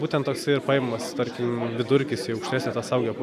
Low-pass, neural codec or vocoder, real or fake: 14.4 kHz; none; real